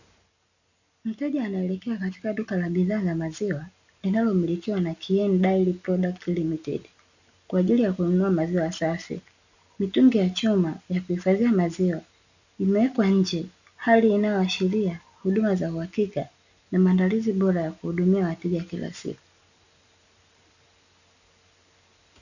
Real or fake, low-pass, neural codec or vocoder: real; 7.2 kHz; none